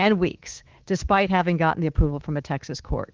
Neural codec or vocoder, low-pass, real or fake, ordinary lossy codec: codec, 24 kHz, 3.1 kbps, DualCodec; 7.2 kHz; fake; Opus, 16 kbps